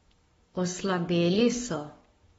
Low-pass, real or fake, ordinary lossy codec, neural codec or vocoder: 19.8 kHz; fake; AAC, 24 kbps; codec, 44.1 kHz, 7.8 kbps, Pupu-Codec